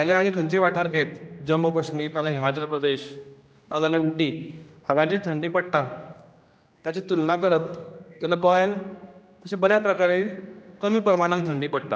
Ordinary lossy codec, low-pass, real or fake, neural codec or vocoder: none; none; fake; codec, 16 kHz, 1 kbps, X-Codec, HuBERT features, trained on general audio